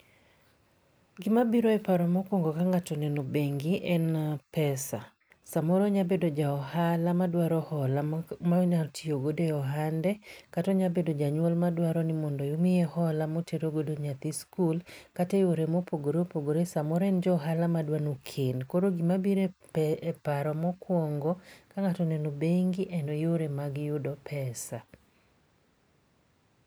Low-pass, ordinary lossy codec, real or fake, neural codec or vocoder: none; none; real; none